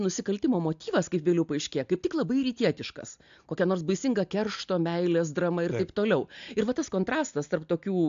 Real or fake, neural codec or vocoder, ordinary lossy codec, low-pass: real; none; AAC, 64 kbps; 7.2 kHz